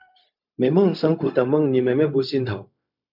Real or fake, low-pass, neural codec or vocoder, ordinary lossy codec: fake; 5.4 kHz; codec, 16 kHz, 0.4 kbps, LongCat-Audio-Codec; MP3, 48 kbps